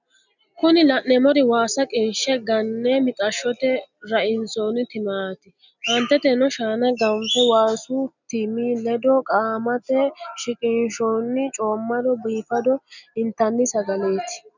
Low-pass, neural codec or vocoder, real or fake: 7.2 kHz; none; real